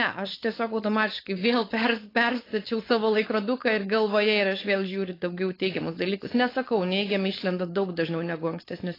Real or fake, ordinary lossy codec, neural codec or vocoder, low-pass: fake; AAC, 24 kbps; codec, 16 kHz, 4.8 kbps, FACodec; 5.4 kHz